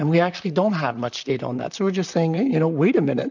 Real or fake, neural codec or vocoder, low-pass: fake; vocoder, 44.1 kHz, 128 mel bands, Pupu-Vocoder; 7.2 kHz